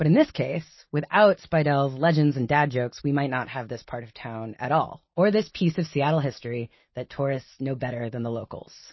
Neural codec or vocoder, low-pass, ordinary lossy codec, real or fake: none; 7.2 kHz; MP3, 24 kbps; real